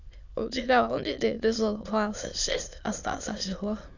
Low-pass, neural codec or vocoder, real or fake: 7.2 kHz; autoencoder, 22.05 kHz, a latent of 192 numbers a frame, VITS, trained on many speakers; fake